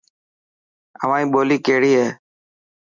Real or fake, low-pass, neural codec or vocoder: real; 7.2 kHz; none